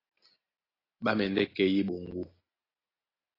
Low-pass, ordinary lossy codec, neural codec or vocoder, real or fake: 5.4 kHz; AAC, 24 kbps; none; real